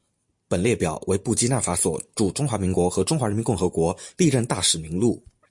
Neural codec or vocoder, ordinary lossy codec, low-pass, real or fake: none; MP3, 48 kbps; 10.8 kHz; real